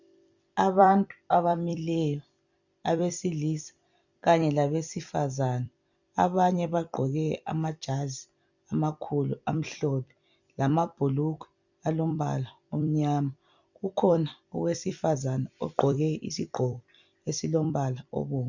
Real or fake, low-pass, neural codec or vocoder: fake; 7.2 kHz; vocoder, 44.1 kHz, 128 mel bands every 256 samples, BigVGAN v2